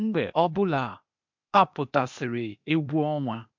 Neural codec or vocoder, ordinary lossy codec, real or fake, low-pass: codec, 16 kHz, 0.8 kbps, ZipCodec; MP3, 64 kbps; fake; 7.2 kHz